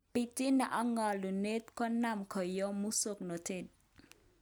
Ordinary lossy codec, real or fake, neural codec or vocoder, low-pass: none; real; none; none